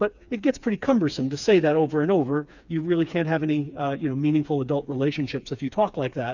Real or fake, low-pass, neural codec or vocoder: fake; 7.2 kHz; codec, 16 kHz, 4 kbps, FreqCodec, smaller model